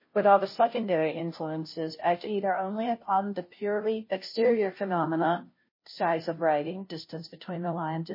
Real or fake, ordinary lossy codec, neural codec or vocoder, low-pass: fake; MP3, 24 kbps; codec, 16 kHz, 0.5 kbps, FunCodec, trained on Chinese and English, 25 frames a second; 5.4 kHz